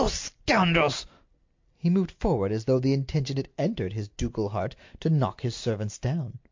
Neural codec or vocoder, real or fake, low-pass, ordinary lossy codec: none; real; 7.2 kHz; MP3, 48 kbps